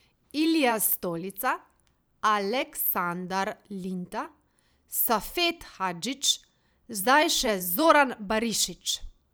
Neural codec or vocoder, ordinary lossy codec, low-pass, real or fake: vocoder, 44.1 kHz, 128 mel bands every 512 samples, BigVGAN v2; none; none; fake